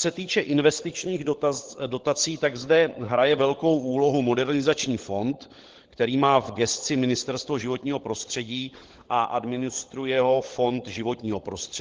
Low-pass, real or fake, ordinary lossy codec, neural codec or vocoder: 7.2 kHz; fake; Opus, 16 kbps; codec, 16 kHz, 16 kbps, FunCodec, trained on LibriTTS, 50 frames a second